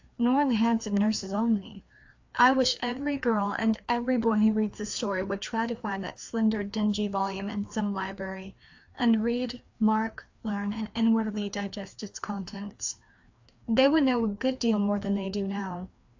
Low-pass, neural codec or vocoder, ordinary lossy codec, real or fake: 7.2 kHz; codec, 16 kHz, 2 kbps, FreqCodec, larger model; AAC, 48 kbps; fake